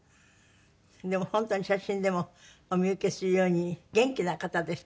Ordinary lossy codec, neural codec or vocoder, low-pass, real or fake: none; none; none; real